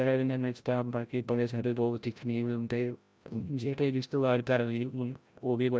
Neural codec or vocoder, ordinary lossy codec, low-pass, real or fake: codec, 16 kHz, 0.5 kbps, FreqCodec, larger model; none; none; fake